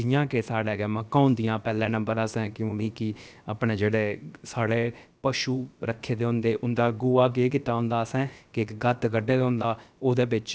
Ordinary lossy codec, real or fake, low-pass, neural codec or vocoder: none; fake; none; codec, 16 kHz, about 1 kbps, DyCAST, with the encoder's durations